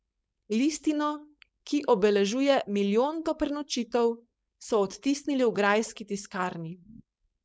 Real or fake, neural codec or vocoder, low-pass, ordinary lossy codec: fake; codec, 16 kHz, 4.8 kbps, FACodec; none; none